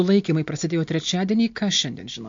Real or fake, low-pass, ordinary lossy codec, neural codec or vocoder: real; 7.2 kHz; MP3, 48 kbps; none